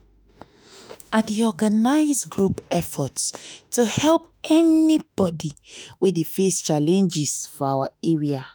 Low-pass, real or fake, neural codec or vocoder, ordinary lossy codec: none; fake; autoencoder, 48 kHz, 32 numbers a frame, DAC-VAE, trained on Japanese speech; none